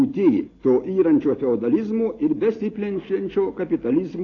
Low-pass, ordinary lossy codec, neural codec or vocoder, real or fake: 7.2 kHz; AAC, 32 kbps; none; real